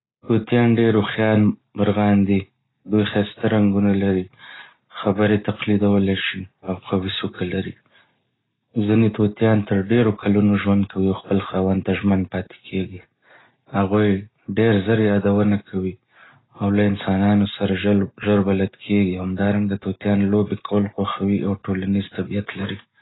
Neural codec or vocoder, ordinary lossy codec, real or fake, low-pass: none; AAC, 16 kbps; real; 7.2 kHz